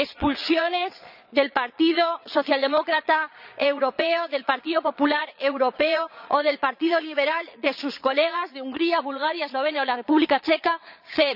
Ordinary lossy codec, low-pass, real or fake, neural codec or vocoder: none; 5.4 kHz; fake; vocoder, 22.05 kHz, 80 mel bands, Vocos